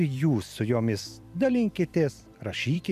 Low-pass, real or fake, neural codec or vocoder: 14.4 kHz; fake; vocoder, 44.1 kHz, 128 mel bands every 256 samples, BigVGAN v2